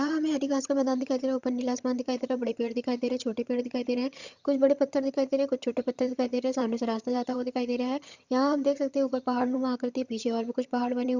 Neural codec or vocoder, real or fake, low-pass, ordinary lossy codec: vocoder, 22.05 kHz, 80 mel bands, HiFi-GAN; fake; 7.2 kHz; Opus, 64 kbps